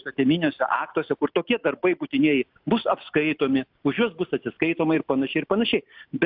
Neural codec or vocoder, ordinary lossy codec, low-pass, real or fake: none; AAC, 48 kbps; 5.4 kHz; real